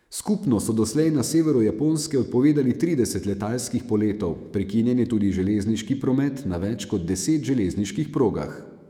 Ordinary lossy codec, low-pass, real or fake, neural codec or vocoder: none; 19.8 kHz; fake; autoencoder, 48 kHz, 128 numbers a frame, DAC-VAE, trained on Japanese speech